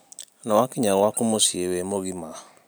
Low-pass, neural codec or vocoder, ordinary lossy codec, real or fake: none; none; none; real